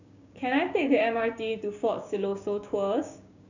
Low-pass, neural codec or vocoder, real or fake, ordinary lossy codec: 7.2 kHz; codec, 16 kHz, 6 kbps, DAC; fake; none